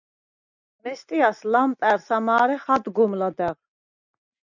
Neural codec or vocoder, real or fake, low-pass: none; real; 7.2 kHz